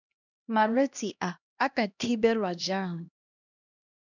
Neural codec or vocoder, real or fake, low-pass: codec, 16 kHz, 1 kbps, X-Codec, HuBERT features, trained on LibriSpeech; fake; 7.2 kHz